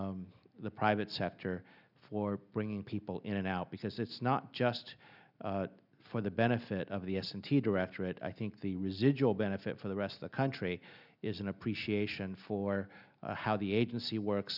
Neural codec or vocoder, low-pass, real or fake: none; 5.4 kHz; real